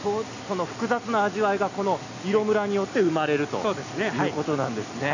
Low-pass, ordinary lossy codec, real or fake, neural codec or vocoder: 7.2 kHz; none; real; none